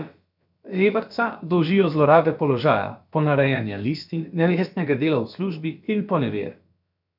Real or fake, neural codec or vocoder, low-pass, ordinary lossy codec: fake; codec, 16 kHz, about 1 kbps, DyCAST, with the encoder's durations; 5.4 kHz; none